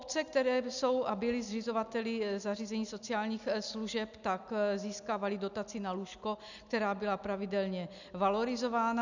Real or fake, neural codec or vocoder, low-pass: real; none; 7.2 kHz